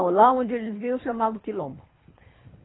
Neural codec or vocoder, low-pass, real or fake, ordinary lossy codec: codec, 24 kHz, 3 kbps, HILCodec; 7.2 kHz; fake; AAC, 16 kbps